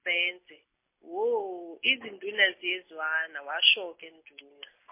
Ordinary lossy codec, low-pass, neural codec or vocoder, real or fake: MP3, 24 kbps; 3.6 kHz; none; real